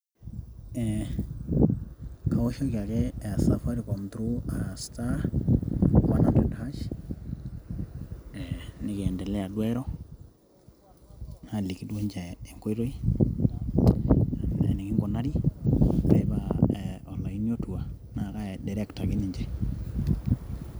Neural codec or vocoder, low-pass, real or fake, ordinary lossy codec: none; none; real; none